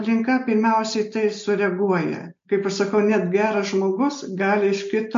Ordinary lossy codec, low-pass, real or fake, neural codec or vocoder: MP3, 48 kbps; 7.2 kHz; real; none